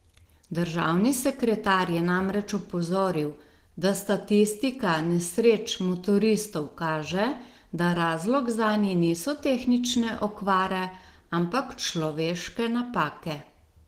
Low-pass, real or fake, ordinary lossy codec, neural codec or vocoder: 14.4 kHz; real; Opus, 16 kbps; none